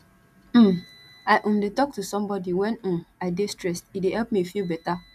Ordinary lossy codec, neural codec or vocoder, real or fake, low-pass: none; none; real; 14.4 kHz